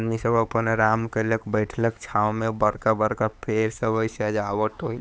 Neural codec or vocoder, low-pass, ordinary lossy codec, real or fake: codec, 16 kHz, 4 kbps, X-Codec, HuBERT features, trained on LibriSpeech; none; none; fake